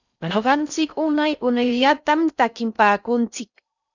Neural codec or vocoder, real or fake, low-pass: codec, 16 kHz in and 24 kHz out, 0.6 kbps, FocalCodec, streaming, 4096 codes; fake; 7.2 kHz